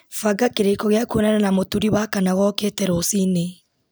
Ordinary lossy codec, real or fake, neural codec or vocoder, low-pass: none; real; none; none